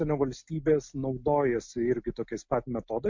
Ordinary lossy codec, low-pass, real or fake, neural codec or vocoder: MP3, 48 kbps; 7.2 kHz; real; none